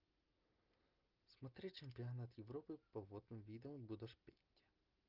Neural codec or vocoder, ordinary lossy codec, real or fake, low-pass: none; Opus, 24 kbps; real; 5.4 kHz